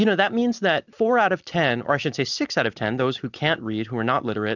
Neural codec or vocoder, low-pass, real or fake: none; 7.2 kHz; real